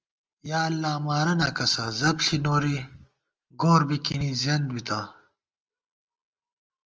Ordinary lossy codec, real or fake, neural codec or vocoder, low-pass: Opus, 24 kbps; real; none; 7.2 kHz